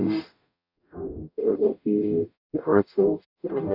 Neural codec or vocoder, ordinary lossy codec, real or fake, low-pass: codec, 44.1 kHz, 0.9 kbps, DAC; none; fake; 5.4 kHz